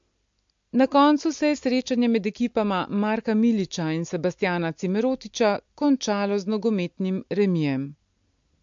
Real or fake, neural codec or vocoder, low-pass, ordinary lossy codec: real; none; 7.2 kHz; MP3, 48 kbps